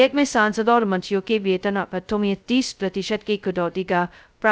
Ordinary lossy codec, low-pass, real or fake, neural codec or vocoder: none; none; fake; codec, 16 kHz, 0.2 kbps, FocalCodec